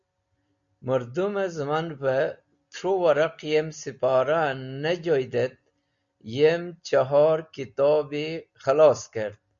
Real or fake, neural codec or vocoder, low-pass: real; none; 7.2 kHz